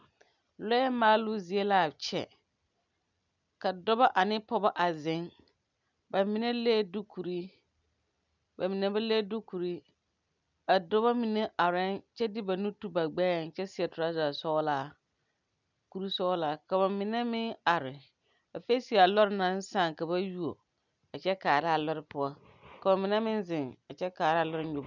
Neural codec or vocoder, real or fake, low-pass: none; real; 7.2 kHz